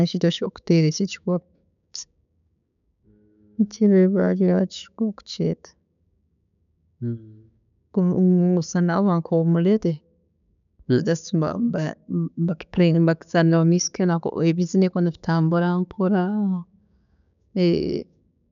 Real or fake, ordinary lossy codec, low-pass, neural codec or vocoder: fake; none; 7.2 kHz; codec, 16 kHz, 6 kbps, DAC